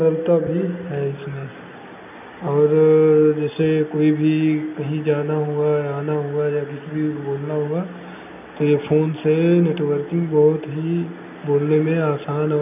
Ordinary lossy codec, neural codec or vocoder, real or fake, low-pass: none; none; real; 3.6 kHz